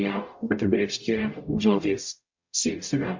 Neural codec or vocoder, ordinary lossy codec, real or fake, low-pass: codec, 44.1 kHz, 0.9 kbps, DAC; MP3, 48 kbps; fake; 7.2 kHz